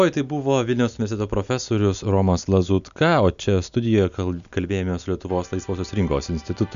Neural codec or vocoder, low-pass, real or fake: none; 7.2 kHz; real